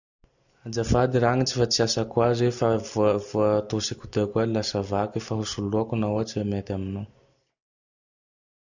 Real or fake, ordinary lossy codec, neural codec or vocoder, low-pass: real; none; none; 7.2 kHz